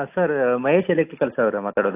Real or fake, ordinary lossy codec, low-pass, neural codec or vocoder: real; AAC, 24 kbps; 3.6 kHz; none